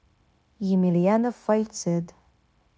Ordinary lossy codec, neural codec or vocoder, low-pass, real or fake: none; codec, 16 kHz, 0.9 kbps, LongCat-Audio-Codec; none; fake